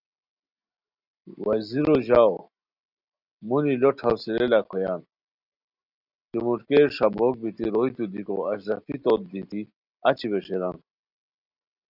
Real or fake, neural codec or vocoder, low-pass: real; none; 5.4 kHz